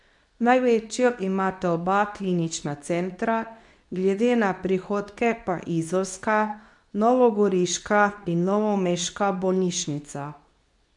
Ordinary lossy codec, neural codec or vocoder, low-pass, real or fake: none; codec, 24 kHz, 0.9 kbps, WavTokenizer, medium speech release version 2; 10.8 kHz; fake